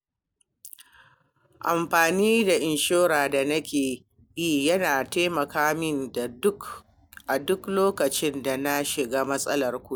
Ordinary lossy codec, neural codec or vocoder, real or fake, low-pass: none; none; real; none